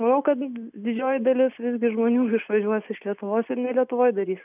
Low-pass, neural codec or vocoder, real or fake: 3.6 kHz; none; real